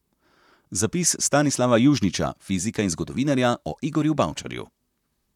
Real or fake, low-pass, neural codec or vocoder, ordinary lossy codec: fake; 19.8 kHz; vocoder, 44.1 kHz, 128 mel bands, Pupu-Vocoder; none